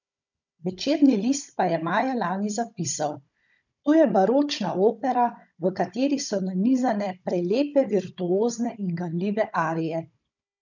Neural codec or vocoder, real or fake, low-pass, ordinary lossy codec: codec, 16 kHz, 16 kbps, FunCodec, trained on Chinese and English, 50 frames a second; fake; 7.2 kHz; none